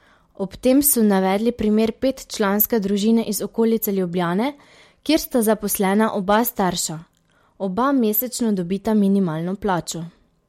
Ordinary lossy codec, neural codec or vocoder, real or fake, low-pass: MP3, 64 kbps; none; real; 19.8 kHz